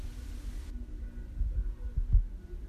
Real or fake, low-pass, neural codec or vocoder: real; 14.4 kHz; none